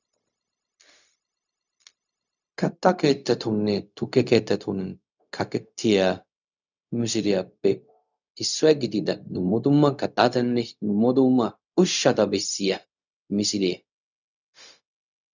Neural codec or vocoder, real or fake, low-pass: codec, 16 kHz, 0.4 kbps, LongCat-Audio-Codec; fake; 7.2 kHz